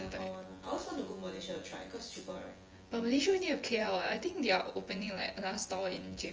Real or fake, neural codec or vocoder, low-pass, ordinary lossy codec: fake; vocoder, 24 kHz, 100 mel bands, Vocos; 7.2 kHz; Opus, 24 kbps